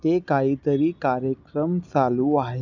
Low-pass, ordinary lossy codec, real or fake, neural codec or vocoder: 7.2 kHz; none; real; none